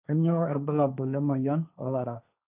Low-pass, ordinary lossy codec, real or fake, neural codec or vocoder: 3.6 kHz; none; fake; codec, 16 kHz, 1.1 kbps, Voila-Tokenizer